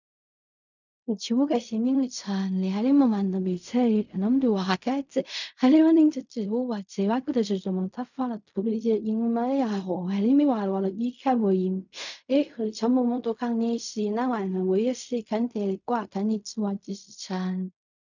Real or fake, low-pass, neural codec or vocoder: fake; 7.2 kHz; codec, 16 kHz in and 24 kHz out, 0.4 kbps, LongCat-Audio-Codec, fine tuned four codebook decoder